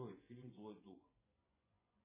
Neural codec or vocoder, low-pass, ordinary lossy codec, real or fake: vocoder, 24 kHz, 100 mel bands, Vocos; 3.6 kHz; MP3, 16 kbps; fake